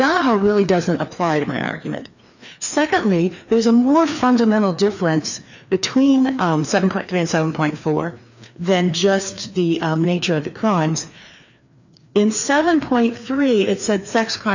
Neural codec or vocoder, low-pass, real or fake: codec, 16 kHz, 2 kbps, FreqCodec, larger model; 7.2 kHz; fake